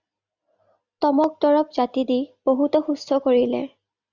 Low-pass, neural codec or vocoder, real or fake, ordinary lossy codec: 7.2 kHz; none; real; Opus, 64 kbps